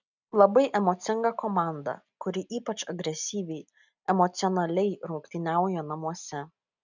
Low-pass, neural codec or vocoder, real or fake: 7.2 kHz; none; real